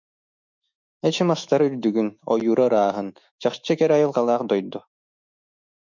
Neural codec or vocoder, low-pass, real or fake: autoencoder, 48 kHz, 128 numbers a frame, DAC-VAE, trained on Japanese speech; 7.2 kHz; fake